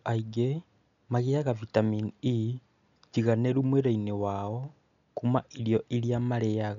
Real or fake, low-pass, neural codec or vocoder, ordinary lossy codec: real; 7.2 kHz; none; none